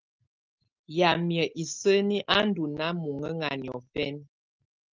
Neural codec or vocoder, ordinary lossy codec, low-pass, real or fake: none; Opus, 24 kbps; 7.2 kHz; real